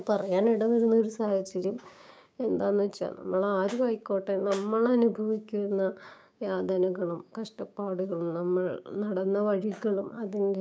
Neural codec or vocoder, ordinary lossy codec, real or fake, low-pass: codec, 16 kHz, 6 kbps, DAC; none; fake; none